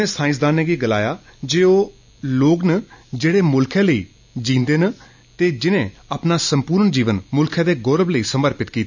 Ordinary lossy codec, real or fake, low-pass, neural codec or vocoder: none; real; 7.2 kHz; none